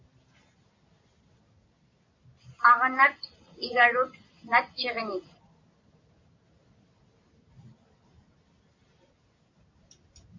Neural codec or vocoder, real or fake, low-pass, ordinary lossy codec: none; real; 7.2 kHz; MP3, 64 kbps